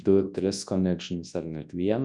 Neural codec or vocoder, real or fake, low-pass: codec, 24 kHz, 0.9 kbps, WavTokenizer, large speech release; fake; 10.8 kHz